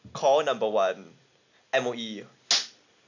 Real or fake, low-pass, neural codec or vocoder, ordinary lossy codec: real; 7.2 kHz; none; none